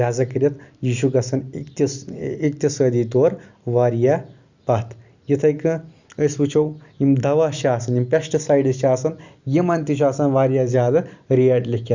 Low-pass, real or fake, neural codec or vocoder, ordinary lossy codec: 7.2 kHz; real; none; Opus, 64 kbps